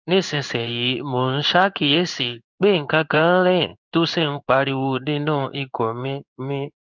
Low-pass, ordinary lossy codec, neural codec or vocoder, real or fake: 7.2 kHz; none; codec, 16 kHz in and 24 kHz out, 1 kbps, XY-Tokenizer; fake